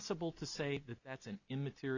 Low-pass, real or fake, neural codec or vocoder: 7.2 kHz; real; none